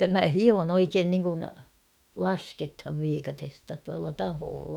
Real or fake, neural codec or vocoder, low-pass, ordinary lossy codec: fake; autoencoder, 48 kHz, 32 numbers a frame, DAC-VAE, trained on Japanese speech; 19.8 kHz; none